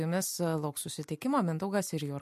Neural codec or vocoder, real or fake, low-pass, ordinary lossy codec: none; real; 14.4 kHz; MP3, 64 kbps